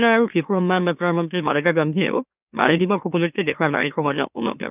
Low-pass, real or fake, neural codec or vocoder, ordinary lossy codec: 3.6 kHz; fake; autoencoder, 44.1 kHz, a latent of 192 numbers a frame, MeloTTS; none